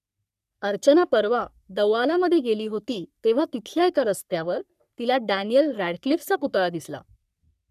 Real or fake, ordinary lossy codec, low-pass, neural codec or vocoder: fake; none; 14.4 kHz; codec, 44.1 kHz, 3.4 kbps, Pupu-Codec